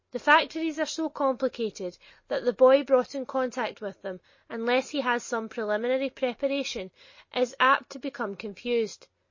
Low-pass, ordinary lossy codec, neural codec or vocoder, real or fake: 7.2 kHz; MP3, 32 kbps; none; real